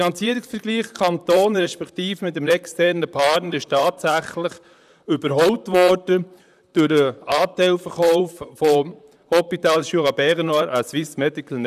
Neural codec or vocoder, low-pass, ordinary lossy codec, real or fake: vocoder, 44.1 kHz, 128 mel bands, Pupu-Vocoder; 14.4 kHz; none; fake